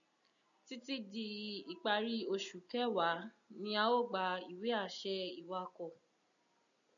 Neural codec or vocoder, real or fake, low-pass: none; real; 7.2 kHz